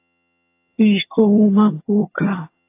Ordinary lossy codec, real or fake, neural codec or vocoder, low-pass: AAC, 24 kbps; fake; vocoder, 22.05 kHz, 80 mel bands, HiFi-GAN; 3.6 kHz